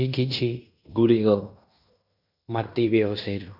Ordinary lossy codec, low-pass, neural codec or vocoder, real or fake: none; 5.4 kHz; codec, 16 kHz in and 24 kHz out, 0.9 kbps, LongCat-Audio-Codec, fine tuned four codebook decoder; fake